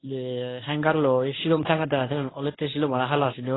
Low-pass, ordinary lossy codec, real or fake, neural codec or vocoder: 7.2 kHz; AAC, 16 kbps; fake; codec, 24 kHz, 0.9 kbps, WavTokenizer, medium speech release version 1